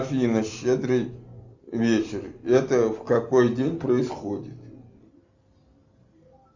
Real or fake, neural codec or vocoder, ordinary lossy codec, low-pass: real; none; Opus, 64 kbps; 7.2 kHz